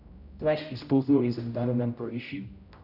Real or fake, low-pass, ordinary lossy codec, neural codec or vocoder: fake; 5.4 kHz; none; codec, 16 kHz, 0.5 kbps, X-Codec, HuBERT features, trained on general audio